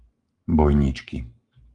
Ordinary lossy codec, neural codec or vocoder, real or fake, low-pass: Opus, 32 kbps; codec, 44.1 kHz, 7.8 kbps, Pupu-Codec; fake; 10.8 kHz